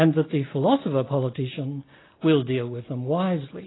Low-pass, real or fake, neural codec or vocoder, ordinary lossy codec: 7.2 kHz; real; none; AAC, 16 kbps